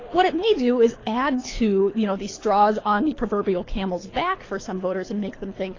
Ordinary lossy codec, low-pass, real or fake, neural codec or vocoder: AAC, 32 kbps; 7.2 kHz; fake; codec, 24 kHz, 6 kbps, HILCodec